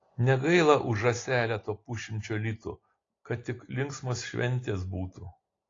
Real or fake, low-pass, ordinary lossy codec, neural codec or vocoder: real; 7.2 kHz; AAC, 32 kbps; none